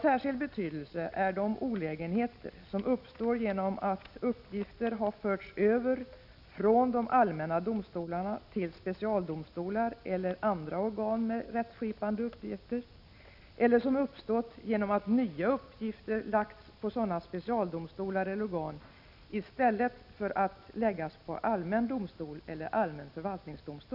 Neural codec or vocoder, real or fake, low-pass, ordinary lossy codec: none; real; 5.4 kHz; none